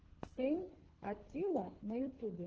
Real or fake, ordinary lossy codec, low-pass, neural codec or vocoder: fake; Opus, 16 kbps; 7.2 kHz; codec, 24 kHz, 3 kbps, HILCodec